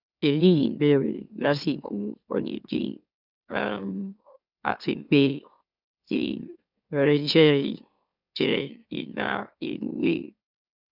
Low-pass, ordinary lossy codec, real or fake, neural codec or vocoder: 5.4 kHz; none; fake; autoencoder, 44.1 kHz, a latent of 192 numbers a frame, MeloTTS